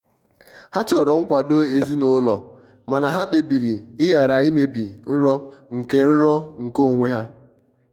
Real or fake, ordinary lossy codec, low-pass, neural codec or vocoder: fake; none; 19.8 kHz; codec, 44.1 kHz, 2.6 kbps, DAC